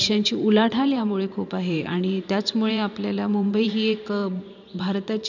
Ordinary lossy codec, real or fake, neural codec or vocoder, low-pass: none; fake; vocoder, 44.1 kHz, 128 mel bands every 512 samples, BigVGAN v2; 7.2 kHz